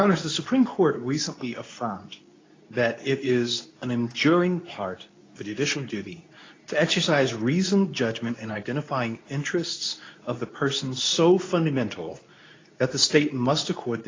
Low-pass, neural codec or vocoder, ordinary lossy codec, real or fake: 7.2 kHz; codec, 24 kHz, 0.9 kbps, WavTokenizer, medium speech release version 2; AAC, 32 kbps; fake